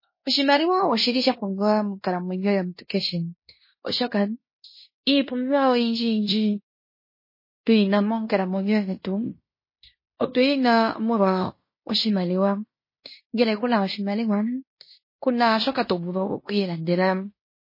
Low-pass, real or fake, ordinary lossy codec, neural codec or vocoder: 5.4 kHz; fake; MP3, 24 kbps; codec, 16 kHz in and 24 kHz out, 0.9 kbps, LongCat-Audio-Codec, fine tuned four codebook decoder